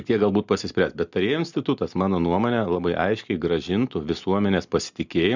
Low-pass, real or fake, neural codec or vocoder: 7.2 kHz; real; none